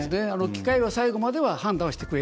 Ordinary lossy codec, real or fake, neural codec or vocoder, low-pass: none; real; none; none